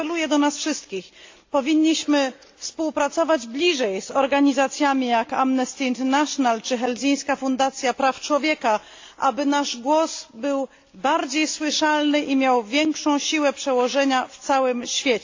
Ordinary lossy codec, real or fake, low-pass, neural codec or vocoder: AAC, 48 kbps; real; 7.2 kHz; none